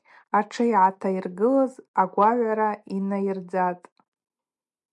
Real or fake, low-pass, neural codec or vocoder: real; 10.8 kHz; none